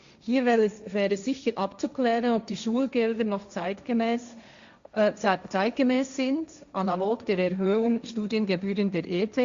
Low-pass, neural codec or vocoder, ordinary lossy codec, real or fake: 7.2 kHz; codec, 16 kHz, 1.1 kbps, Voila-Tokenizer; Opus, 64 kbps; fake